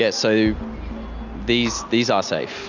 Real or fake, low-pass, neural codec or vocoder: real; 7.2 kHz; none